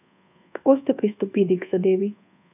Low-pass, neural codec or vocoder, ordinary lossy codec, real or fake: 3.6 kHz; codec, 24 kHz, 1.2 kbps, DualCodec; none; fake